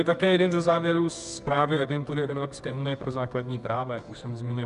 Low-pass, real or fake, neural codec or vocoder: 10.8 kHz; fake; codec, 24 kHz, 0.9 kbps, WavTokenizer, medium music audio release